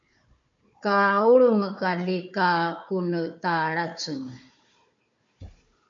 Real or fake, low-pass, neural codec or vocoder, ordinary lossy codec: fake; 7.2 kHz; codec, 16 kHz, 4 kbps, FunCodec, trained on Chinese and English, 50 frames a second; MP3, 48 kbps